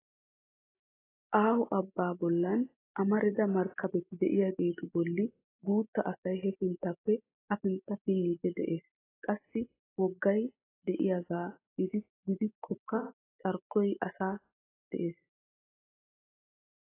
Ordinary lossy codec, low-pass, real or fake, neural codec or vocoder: AAC, 16 kbps; 3.6 kHz; real; none